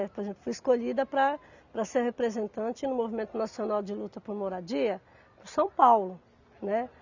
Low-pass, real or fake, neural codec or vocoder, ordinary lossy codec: 7.2 kHz; real; none; none